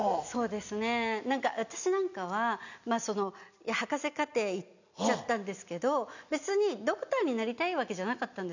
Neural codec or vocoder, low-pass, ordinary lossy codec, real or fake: none; 7.2 kHz; none; real